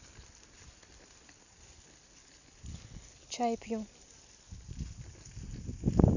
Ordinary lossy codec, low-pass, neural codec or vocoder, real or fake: none; 7.2 kHz; none; real